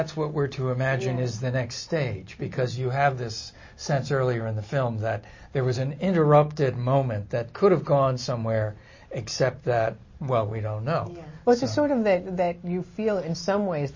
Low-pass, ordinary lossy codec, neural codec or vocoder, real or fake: 7.2 kHz; MP3, 32 kbps; none; real